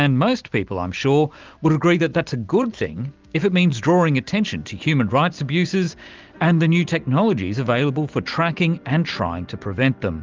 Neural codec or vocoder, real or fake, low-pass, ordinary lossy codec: none; real; 7.2 kHz; Opus, 32 kbps